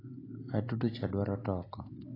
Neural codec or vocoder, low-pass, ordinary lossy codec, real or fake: none; 5.4 kHz; AAC, 24 kbps; real